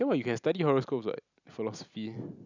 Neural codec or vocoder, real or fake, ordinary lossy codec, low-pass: none; real; none; 7.2 kHz